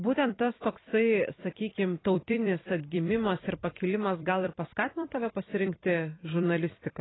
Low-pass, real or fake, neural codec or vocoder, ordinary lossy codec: 7.2 kHz; fake; vocoder, 44.1 kHz, 128 mel bands every 256 samples, BigVGAN v2; AAC, 16 kbps